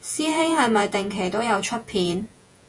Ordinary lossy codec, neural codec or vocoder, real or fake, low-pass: Opus, 64 kbps; vocoder, 48 kHz, 128 mel bands, Vocos; fake; 10.8 kHz